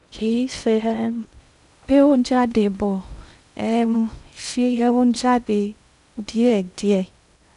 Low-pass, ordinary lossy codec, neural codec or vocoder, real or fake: 10.8 kHz; none; codec, 16 kHz in and 24 kHz out, 0.6 kbps, FocalCodec, streaming, 2048 codes; fake